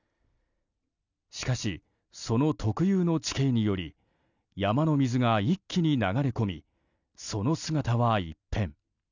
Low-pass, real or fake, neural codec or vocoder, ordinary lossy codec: 7.2 kHz; real; none; none